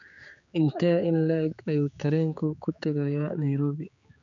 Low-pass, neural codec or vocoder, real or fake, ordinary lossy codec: 7.2 kHz; codec, 16 kHz, 4 kbps, X-Codec, HuBERT features, trained on general audio; fake; none